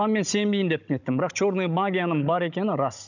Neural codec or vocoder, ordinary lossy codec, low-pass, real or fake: codec, 16 kHz, 16 kbps, FunCodec, trained on Chinese and English, 50 frames a second; none; 7.2 kHz; fake